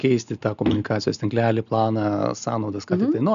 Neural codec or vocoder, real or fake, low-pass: none; real; 7.2 kHz